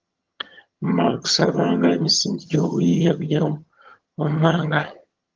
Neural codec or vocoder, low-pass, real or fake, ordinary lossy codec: vocoder, 22.05 kHz, 80 mel bands, HiFi-GAN; 7.2 kHz; fake; Opus, 24 kbps